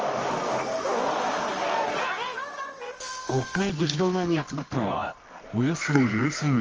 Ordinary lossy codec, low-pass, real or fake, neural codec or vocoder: Opus, 24 kbps; 7.2 kHz; fake; codec, 24 kHz, 0.9 kbps, WavTokenizer, medium music audio release